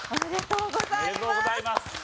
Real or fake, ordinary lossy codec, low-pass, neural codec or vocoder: real; none; none; none